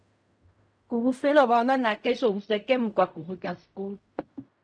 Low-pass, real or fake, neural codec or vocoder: 9.9 kHz; fake; codec, 16 kHz in and 24 kHz out, 0.4 kbps, LongCat-Audio-Codec, fine tuned four codebook decoder